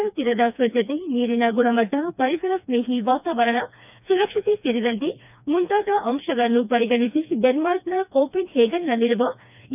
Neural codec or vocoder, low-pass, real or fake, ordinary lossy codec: codec, 16 kHz, 2 kbps, FreqCodec, smaller model; 3.6 kHz; fake; none